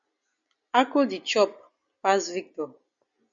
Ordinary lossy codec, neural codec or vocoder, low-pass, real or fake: MP3, 64 kbps; none; 7.2 kHz; real